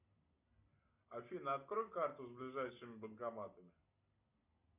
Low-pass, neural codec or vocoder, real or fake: 3.6 kHz; none; real